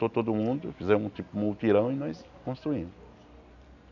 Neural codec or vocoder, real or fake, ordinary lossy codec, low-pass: none; real; none; 7.2 kHz